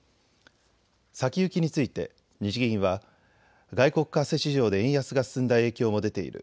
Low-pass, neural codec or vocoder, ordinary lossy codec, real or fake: none; none; none; real